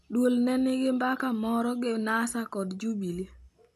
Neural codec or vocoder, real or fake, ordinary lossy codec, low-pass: none; real; none; 14.4 kHz